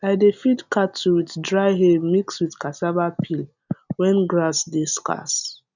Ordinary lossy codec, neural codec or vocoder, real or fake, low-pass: none; none; real; 7.2 kHz